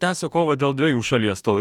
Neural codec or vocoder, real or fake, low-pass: codec, 44.1 kHz, 2.6 kbps, DAC; fake; 19.8 kHz